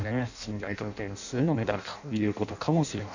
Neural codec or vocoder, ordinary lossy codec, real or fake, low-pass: codec, 16 kHz in and 24 kHz out, 0.6 kbps, FireRedTTS-2 codec; none; fake; 7.2 kHz